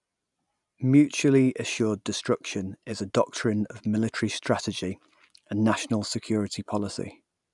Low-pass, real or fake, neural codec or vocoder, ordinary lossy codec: 10.8 kHz; real; none; none